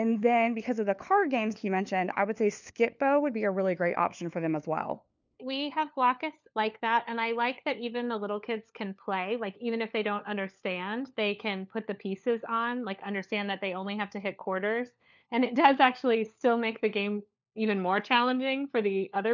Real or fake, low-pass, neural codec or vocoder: fake; 7.2 kHz; codec, 16 kHz, 4 kbps, FunCodec, trained on LibriTTS, 50 frames a second